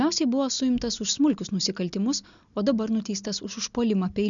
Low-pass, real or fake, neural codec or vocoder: 7.2 kHz; real; none